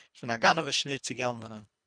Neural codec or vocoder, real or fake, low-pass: codec, 24 kHz, 1.5 kbps, HILCodec; fake; 9.9 kHz